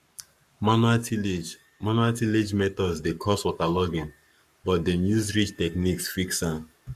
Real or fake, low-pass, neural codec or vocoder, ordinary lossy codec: fake; 14.4 kHz; codec, 44.1 kHz, 7.8 kbps, Pupu-Codec; Opus, 64 kbps